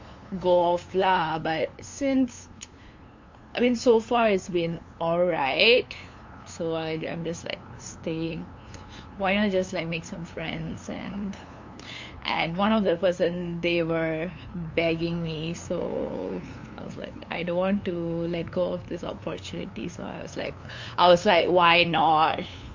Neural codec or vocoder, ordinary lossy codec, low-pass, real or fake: codec, 16 kHz, 2 kbps, FunCodec, trained on LibriTTS, 25 frames a second; MP3, 48 kbps; 7.2 kHz; fake